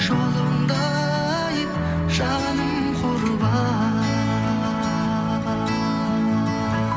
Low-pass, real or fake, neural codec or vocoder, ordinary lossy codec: none; real; none; none